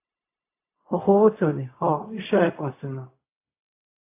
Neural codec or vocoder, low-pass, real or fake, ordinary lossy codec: codec, 16 kHz, 0.4 kbps, LongCat-Audio-Codec; 3.6 kHz; fake; AAC, 24 kbps